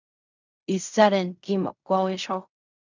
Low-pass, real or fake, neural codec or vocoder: 7.2 kHz; fake; codec, 16 kHz in and 24 kHz out, 0.4 kbps, LongCat-Audio-Codec, fine tuned four codebook decoder